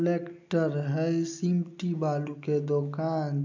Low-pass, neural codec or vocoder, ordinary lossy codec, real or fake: 7.2 kHz; none; AAC, 48 kbps; real